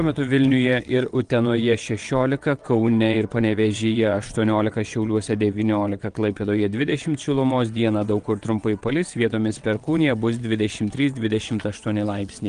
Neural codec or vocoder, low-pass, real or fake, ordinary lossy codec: vocoder, 22.05 kHz, 80 mel bands, WaveNeXt; 9.9 kHz; fake; Opus, 24 kbps